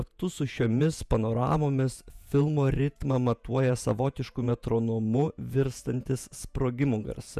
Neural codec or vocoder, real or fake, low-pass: vocoder, 48 kHz, 128 mel bands, Vocos; fake; 14.4 kHz